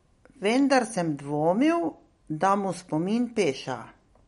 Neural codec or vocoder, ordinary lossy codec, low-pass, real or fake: none; MP3, 48 kbps; 19.8 kHz; real